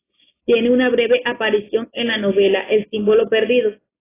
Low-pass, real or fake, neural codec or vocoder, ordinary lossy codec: 3.6 kHz; real; none; AAC, 16 kbps